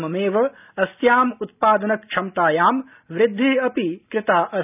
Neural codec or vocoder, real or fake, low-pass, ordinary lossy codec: none; real; 3.6 kHz; none